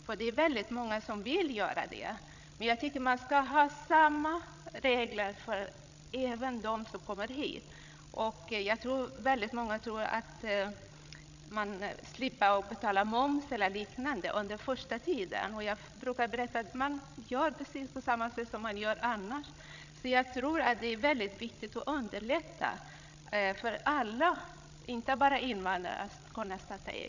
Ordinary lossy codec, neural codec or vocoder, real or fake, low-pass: none; codec, 16 kHz, 16 kbps, FreqCodec, larger model; fake; 7.2 kHz